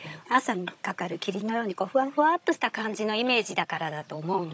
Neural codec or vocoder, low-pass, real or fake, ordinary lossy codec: codec, 16 kHz, 16 kbps, FunCodec, trained on Chinese and English, 50 frames a second; none; fake; none